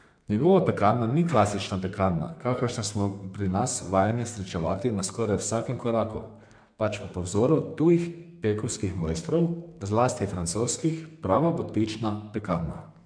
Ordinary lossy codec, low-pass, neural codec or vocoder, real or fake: MP3, 64 kbps; 9.9 kHz; codec, 32 kHz, 1.9 kbps, SNAC; fake